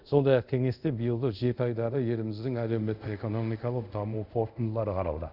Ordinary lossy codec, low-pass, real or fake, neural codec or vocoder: none; 5.4 kHz; fake; codec, 24 kHz, 0.5 kbps, DualCodec